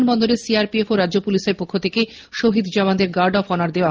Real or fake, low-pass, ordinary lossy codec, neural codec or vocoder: real; 7.2 kHz; Opus, 24 kbps; none